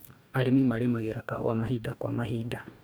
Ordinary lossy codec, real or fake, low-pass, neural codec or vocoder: none; fake; none; codec, 44.1 kHz, 2.6 kbps, DAC